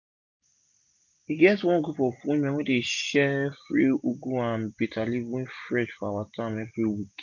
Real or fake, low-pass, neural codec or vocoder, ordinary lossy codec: real; 7.2 kHz; none; none